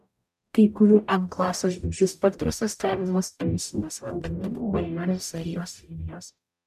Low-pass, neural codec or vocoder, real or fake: 14.4 kHz; codec, 44.1 kHz, 0.9 kbps, DAC; fake